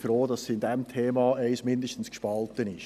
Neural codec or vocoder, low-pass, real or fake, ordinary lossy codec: none; 14.4 kHz; real; none